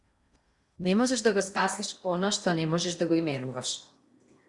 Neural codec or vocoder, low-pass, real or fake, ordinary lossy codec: codec, 16 kHz in and 24 kHz out, 0.8 kbps, FocalCodec, streaming, 65536 codes; 10.8 kHz; fake; Opus, 64 kbps